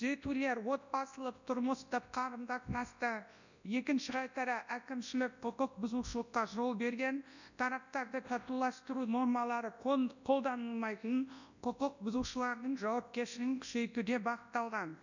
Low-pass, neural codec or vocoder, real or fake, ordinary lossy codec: 7.2 kHz; codec, 24 kHz, 0.9 kbps, WavTokenizer, large speech release; fake; none